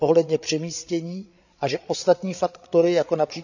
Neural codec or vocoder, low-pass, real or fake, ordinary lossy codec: codec, 16 kHz, 8 kbps, FreqCodec, larger model; 7.2 kHz; fake; none